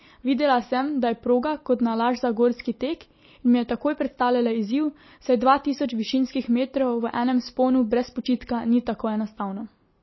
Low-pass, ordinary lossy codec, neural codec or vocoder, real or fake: 7.2 kHz; MP3, 24 kbps; none; real